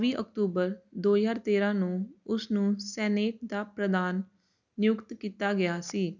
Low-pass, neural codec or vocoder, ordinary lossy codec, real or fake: 7.2 kHz; none; none; real